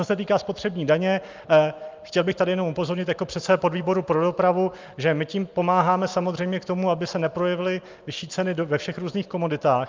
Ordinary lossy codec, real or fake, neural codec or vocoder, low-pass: Opus, 24 kbps; real; none; 7.2 kHz